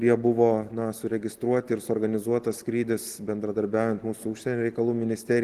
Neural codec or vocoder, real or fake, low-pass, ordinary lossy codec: none; real; 14.4 kHz; Opus, 16 kbps